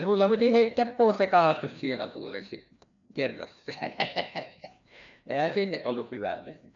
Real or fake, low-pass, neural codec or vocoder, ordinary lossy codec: fake; 7.2 kHz; codec, 16 kHz, 1 kbps, FreqCodec, larger model; none